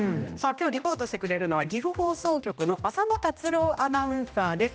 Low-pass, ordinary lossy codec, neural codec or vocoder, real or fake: none; none; codec, 16 kHz, 1 kbps, X-Codec, HuBERT features, trained on general audio; fake